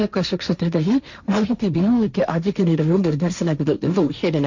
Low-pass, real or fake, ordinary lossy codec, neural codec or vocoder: 7.2 kHz; fake; none; codec, 16 kHz, 1.1 kbps, Voila-Tokenizer